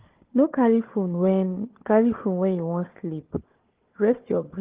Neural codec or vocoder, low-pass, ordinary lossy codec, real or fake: codec, 16 kHz, 4 kbps, FunCodec, trained on Chinese and English, 50 frames a second; 3.6 kHz; Opus, 16 kbps; fake